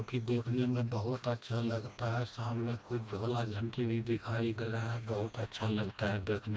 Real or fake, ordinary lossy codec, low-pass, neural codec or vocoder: fake; none; none; codec, 16 kHz, 1 kbps, FreqCodec, smaller model